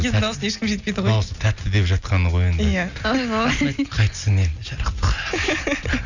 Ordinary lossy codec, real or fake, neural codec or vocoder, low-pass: none; real; none; 7.2 kHz